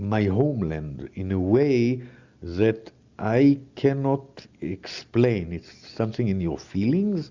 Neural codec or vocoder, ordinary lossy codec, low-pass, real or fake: none; Opus, 64 kbps; 7.2 kHz; real